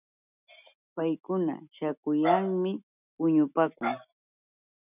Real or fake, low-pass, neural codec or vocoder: real; 3.6 kHz; none